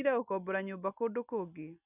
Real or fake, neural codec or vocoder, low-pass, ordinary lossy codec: real; none; 3.6 kHz; none